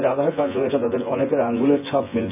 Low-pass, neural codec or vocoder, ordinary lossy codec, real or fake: 3.6 kHz; vocoder, 24 kHz, 100 mel bands, Vocos; none; fake